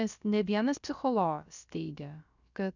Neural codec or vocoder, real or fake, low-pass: codec, 16 kHz, about 1 kbps, DyCAST, with the encoder's durations; fake; 7.2 kHz